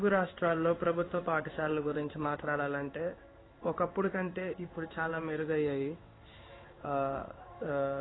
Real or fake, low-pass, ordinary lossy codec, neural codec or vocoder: fake; 7.2 kHz; AAC, 16 kbps; codec, 16 kHz in and 24 kHz out, 1 kbps, XY-Tokenizer